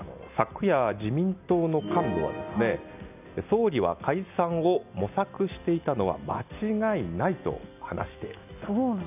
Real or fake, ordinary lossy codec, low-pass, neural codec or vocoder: real; none; 3.6 kHz; none